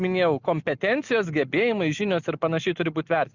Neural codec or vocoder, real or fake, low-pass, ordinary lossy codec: none; real; 7.2 kHz; Opus, 64 kbps